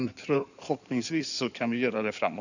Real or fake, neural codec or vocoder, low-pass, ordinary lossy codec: fake; codec, 16 kHz in and 24 kHz out, 2.2 kbps, FireRedTTS-2 codec; 7.2 kHz; none